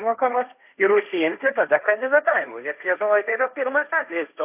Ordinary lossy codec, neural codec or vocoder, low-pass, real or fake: AAC, 32 kbps; codec, 16 kHz, 1.1 kbps, Voila-Tokenizer; 3.6 kHz; fake